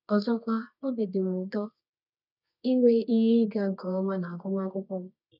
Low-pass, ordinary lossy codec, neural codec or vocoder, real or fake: 5.4 kHz; none; codec, 24 kHz, 0.9 kbps, WavTokenizer, medium music audio release; fake